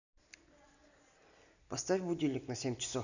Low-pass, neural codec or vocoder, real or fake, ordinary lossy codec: 7.2 kHz; none; real; none